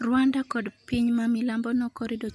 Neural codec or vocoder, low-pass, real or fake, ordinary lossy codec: none; none; real; none